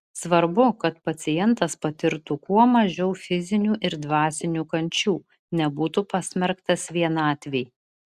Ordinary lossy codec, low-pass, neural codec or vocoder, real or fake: Opus, 64 kbps; 14.4 kHz; none; real